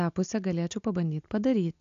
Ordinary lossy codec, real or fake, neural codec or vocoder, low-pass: AAC, 64 kbps; real; none; 7.2 kHz